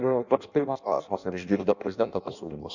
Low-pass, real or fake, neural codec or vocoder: 7.2 kHz; fake; codec, 16 kHz in and 24 kHz out, 0.6 kbps, FireRedTTS-2 codec